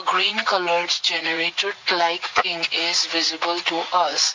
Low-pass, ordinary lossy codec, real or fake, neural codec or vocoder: 7.2 kHz; MP3, 48 kbps; fake; vocoder, 44.1 kHz, 80 mel bands, Vocos